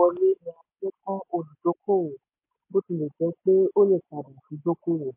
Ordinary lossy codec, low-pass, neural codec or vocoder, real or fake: MP3, 24 kbps; 3.6 kHz; none; real